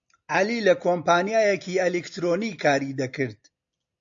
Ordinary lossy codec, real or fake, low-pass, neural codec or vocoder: AAC, 64 kbps; real; 7.2 kHz; none